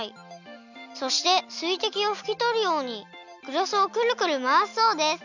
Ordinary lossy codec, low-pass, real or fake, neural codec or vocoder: none; 7.2 kHz; real; none